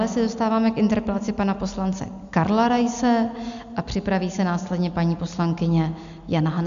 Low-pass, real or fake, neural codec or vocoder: 7.2 kHz; real; none